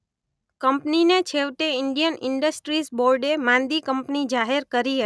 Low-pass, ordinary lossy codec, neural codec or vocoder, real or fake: none; none; none; real